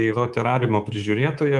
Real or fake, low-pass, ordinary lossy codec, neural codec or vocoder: fake; 10.8 kHz; Opus, 32 kbps; codec, 24 kHz, 3.1 kbps, DualCodec